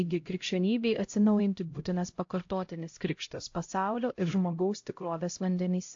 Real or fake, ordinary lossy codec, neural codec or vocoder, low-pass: fake; AAC, 48 kbps; codec, 16 kHz, 0.5 kbps, X-Codec, HuBERT features, trained on LibriSpeech; 7.2 kHz